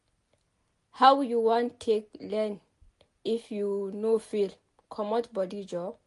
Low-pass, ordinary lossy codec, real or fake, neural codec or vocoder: 14.4 kHz; MP3, 48 kbps; real; none